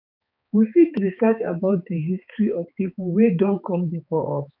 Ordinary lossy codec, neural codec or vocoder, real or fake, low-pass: none; codec, 16 kHz, 4 kbps, X-Codec, HuBERT features, trained on balanced general audio; fake; 5.4 kHz